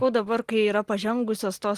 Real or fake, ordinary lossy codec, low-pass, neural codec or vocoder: real; Opus, 24 kbps; 14.4 kHz; none